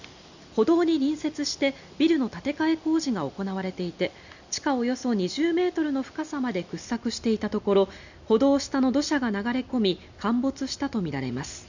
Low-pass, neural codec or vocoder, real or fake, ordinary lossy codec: 7.2 kHz; none; real; none